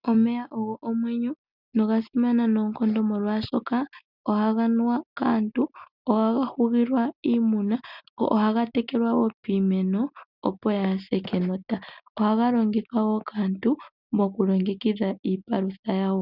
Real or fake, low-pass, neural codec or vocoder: real; 5.4 kHz; none